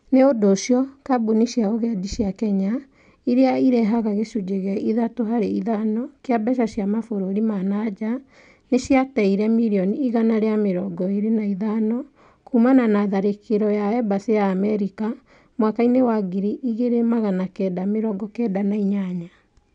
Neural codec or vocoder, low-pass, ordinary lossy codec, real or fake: none; 9.9 kHz; none; real